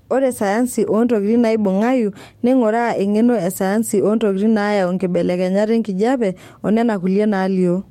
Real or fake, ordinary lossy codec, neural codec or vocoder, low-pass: fake; MP3, 64 kbps; codec, 44.1 kHz, 7.8 kbps, DAC; 19.8 kHz